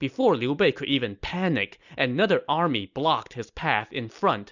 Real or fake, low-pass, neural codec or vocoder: real; 7.2 kHz; none